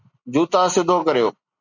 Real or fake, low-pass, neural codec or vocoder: real; 7.2 kHz; none